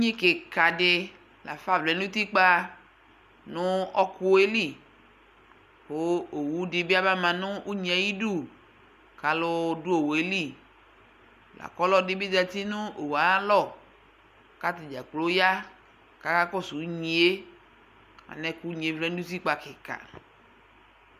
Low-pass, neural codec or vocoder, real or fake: 14.4 kHz; none; real